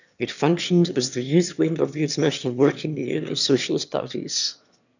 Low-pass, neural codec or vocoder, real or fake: 7.2 kHz; autoencoder, 22.05 kHz, a latent of 192 numbers a frame, VITS, trained on one speaker; fake